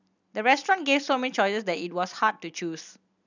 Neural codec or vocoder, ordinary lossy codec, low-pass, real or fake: none; none; 7.2 kHz; real